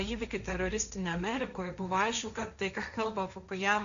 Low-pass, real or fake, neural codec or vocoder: 7.2 kHz; fake; codec, 16 kHz, 1.1 kbps, Voila-Tokenizer